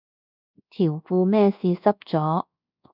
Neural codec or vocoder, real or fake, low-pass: codec, 24 kHz, 1.2 kbps, DualCodec; fake; 5.4 kHz